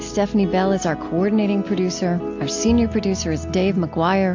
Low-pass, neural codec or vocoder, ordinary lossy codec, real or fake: 7.2 kHz; none; AAC, 48 kbps; real